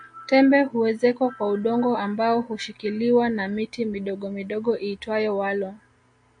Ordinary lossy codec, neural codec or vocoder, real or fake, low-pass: MP3, 48 kbps; none; real; 9.9 kHz